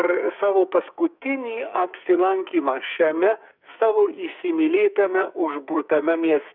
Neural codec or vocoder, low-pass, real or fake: codec, 44.1 kHz, 2.6 kbps, SNAC; 5.4 kHz; fake